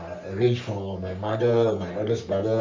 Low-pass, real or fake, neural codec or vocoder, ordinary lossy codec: 7.2 kHz; fake; codec, 44.1 kHz, 3.4 kbps, Pupu-Codec; MP3, 64 kbps